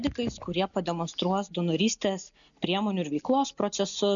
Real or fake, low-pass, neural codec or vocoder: real; 7.2 kHz; none